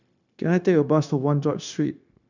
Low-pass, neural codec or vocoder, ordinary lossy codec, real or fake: 7.2 kHz; codec, 16 kHz, 0.9 kbps, LongCat-Audio-Codec; none; fake